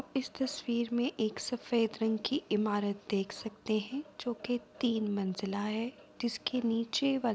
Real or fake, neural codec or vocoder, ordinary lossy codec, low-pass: real; none; none; none